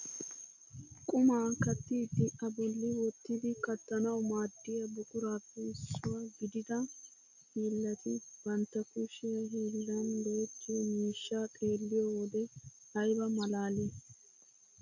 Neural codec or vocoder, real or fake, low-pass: none; real; 7.2 kHz